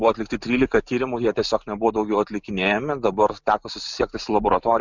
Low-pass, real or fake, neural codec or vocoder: 7.2 kHz; real; none